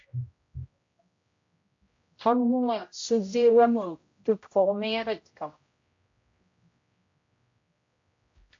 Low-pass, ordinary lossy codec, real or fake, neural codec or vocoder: 7.2 kHz; AAC, 48 kbps; fake; codec, 16 kHz, 0.5 kbps, X-Codec, HuBERT features, trained on general audio